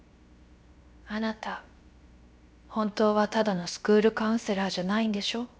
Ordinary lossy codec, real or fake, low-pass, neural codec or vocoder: none; fake; none; codec, 16 kHz, 0.7 kbps, FocalCodec